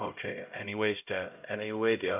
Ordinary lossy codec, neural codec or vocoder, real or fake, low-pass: none; codec, 16 kHz, 0.5 kbps, X-Codec, HuBERT features, trained on LibriSpeech; fake; 3.6 kHz